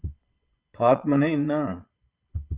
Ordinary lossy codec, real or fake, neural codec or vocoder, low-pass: Opus, 24 kbps; fake; vocoder, 22.05 kHz, 80 mel bands, Vocos; 3.6 kHz